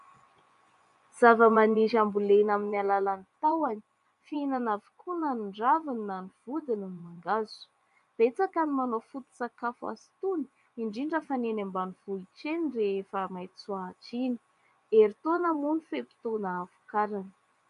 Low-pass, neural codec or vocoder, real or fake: 10.8 kHz; vocoder, 24 kHz, 100 mel bands, Vocos; fake